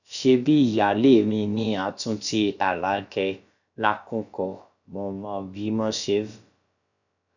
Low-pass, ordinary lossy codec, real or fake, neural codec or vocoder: 7.2 kHz; none; fake; codec, 16 kHz, about 1 kbps, DyCAST, with the encoder's durations